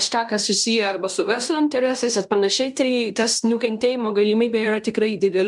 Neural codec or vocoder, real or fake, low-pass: codec, 16 kHz in and 24 kHz out, 0.9 kbps, LongCat-Audio-Codec, fine tuned four codebook decoder; fake; 10.8 kHz